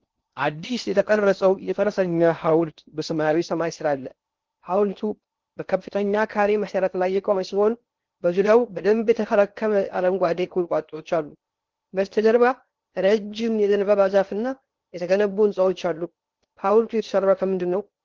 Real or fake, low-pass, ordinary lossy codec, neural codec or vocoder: fake; 7.2 kHz; Opus, 16 kbps; codec, 16 kHz in and 24 kHz out, 0.6 kbps, FocalCodec, streaming, 4096 codes